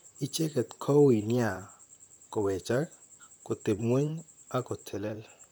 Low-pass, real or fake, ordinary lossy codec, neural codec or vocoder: none; fake; none; vocoder, 44.1 kHz, 128 mel bands, Pupu-Vocoder